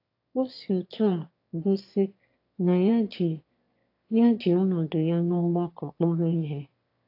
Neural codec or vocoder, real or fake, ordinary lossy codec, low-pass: autoencoder, 22.05 kHz, a latent of 192 numbers a frame, VITS, trained on one speaker; fake; AAC, 32 kbps; 5.4 kHz